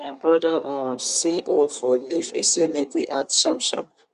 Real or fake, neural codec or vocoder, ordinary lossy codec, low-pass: fake; codec, 24 kHz, 1 kbps, SNAC; Opus, 64 kbps; 10.8 kHz